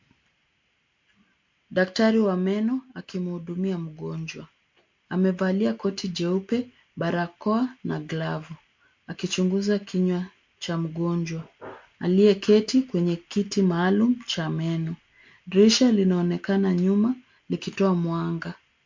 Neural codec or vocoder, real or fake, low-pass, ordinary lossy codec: none; real; 7.2 kHz; MP3, 48 kbps